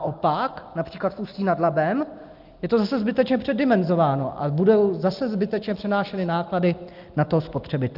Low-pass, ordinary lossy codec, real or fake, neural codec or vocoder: 5.4 kHz; Opus, 32 kbps; real; none